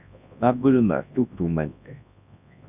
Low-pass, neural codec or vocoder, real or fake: 3.6 kHz; codec, 24 kHz, 0.9 kbps, WavTokenizer, large speech release; fake